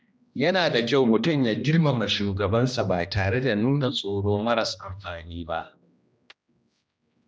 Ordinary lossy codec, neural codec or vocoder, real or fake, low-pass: none; codec, 16 kHz, 1 kbps, X-Codec, HuBERT features, trained on general audio; fake; none